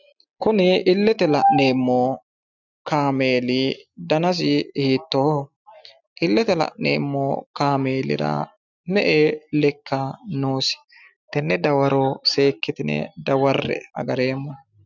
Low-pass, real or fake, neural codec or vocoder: 7.2 kHz; real; none